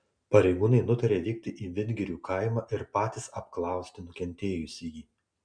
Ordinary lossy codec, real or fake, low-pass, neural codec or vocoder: MP3, 96 kbps; real; 9.9 kHz; none